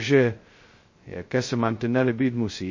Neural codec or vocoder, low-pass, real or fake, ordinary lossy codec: codec, 16 kHz, 0.2 kbps, FocalCodec; 7.2 kHz; fake; MP3, 32 kbps